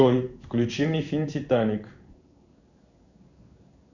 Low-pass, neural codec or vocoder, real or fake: 7.2 kHz; codec, 16 kHz in and 24 kHz out, 1 kbps, XY-Tokenizer; fake